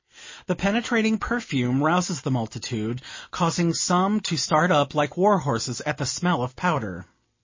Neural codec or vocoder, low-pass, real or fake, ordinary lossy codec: none; 7.2 kHz; real; MP3, 32 kbps